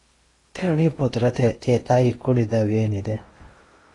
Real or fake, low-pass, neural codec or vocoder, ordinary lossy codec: fake; 10.8 kHz; codec, 16 kHz in and 24 kHz out, 0.8 kbps, FocalCodec, streaming, 65536 codes; AAC, 32 kbps